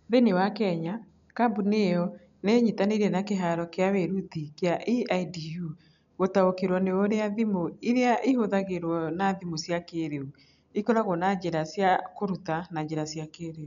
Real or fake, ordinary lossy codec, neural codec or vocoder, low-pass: real; none; none; 7.2 kHz